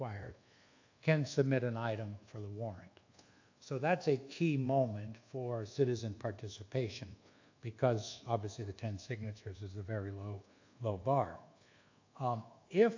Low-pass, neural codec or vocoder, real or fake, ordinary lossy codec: 7.2 kHz; codec, 24 kHz, 1.2 kbps, DualCodec; fake; AAC, 48 kbps